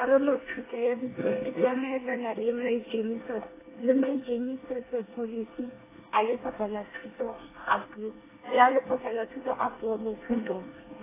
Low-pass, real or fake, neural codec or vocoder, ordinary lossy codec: 3.6 kHz; fake; codec, 24 kHz, 1 kbps, SNAC; AAC, 16 kbps